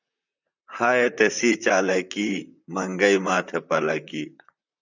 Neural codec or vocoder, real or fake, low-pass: vocoder, 44.1 kHz, 128 mel bands, Pupu-Vocoder; fake; 7.2 kHz